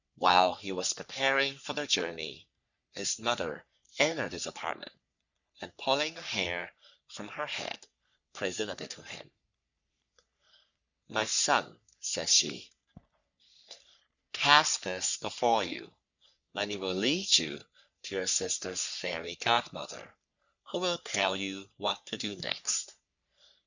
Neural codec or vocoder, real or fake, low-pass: codec, 44.1 kHz, 3.4 kbps, Pupu-Codec; fake; 7.2 kHz